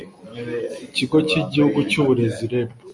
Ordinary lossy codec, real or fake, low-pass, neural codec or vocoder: MP3, 48 kbps; real; 10.8 kHz; none